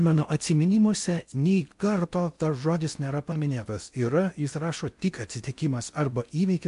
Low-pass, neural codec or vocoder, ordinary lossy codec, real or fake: 10.8 kHz; codec, 16 kHz in and 24 kHz out, 0.6 kbps, FocalCodec, streaming, 4096 codes; MP3, 64 kbps; fake